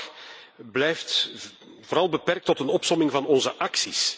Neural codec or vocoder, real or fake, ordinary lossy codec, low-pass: none; real; none; none